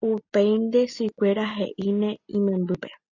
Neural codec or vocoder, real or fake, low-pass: none; real; 7.2 kHz